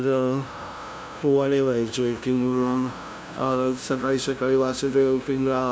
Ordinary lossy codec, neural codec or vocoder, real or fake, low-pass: none; codec, 16 kHz, 0.5 kbps, FunCodec, trained on LibriTTS, 25 frames a second; fake; none